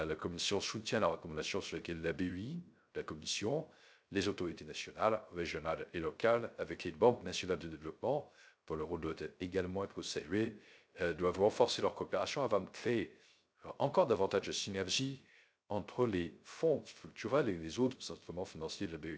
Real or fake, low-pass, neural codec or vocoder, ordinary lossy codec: fake; none; codec, 16 kHz, 0.3 kbps, FocalCodec; none